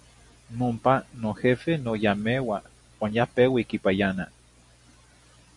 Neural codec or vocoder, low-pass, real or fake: none; 10.8 kHz; real